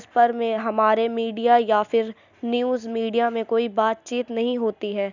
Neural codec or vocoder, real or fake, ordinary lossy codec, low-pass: none; real; none; 7.2 kHz